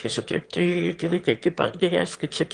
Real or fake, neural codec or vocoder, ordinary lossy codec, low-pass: fake; autoencoder, 22.05 kHz, a latent of 192 numbers a frame, VITS, trained on one speaker; Opus, 24 kbps; 9.9 kHz